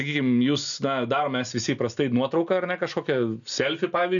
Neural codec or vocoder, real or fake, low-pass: none; real; 7.2 kHz